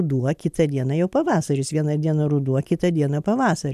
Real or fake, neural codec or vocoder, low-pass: real; none; 14.4 kHz